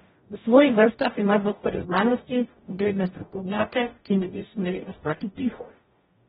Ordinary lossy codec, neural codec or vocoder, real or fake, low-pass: AAC, 16 kbps; codec, 44.1 kHz, 0.9 kbps, DAC; fake; 19.8 kHz